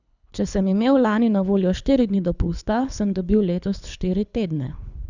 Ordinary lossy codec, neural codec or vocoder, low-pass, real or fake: none; codec, 24 kHz, 6 kbps, HILCodec; 7.2 kHz; fake